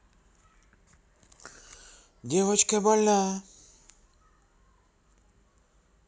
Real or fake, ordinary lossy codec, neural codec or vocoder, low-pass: real; none; none; none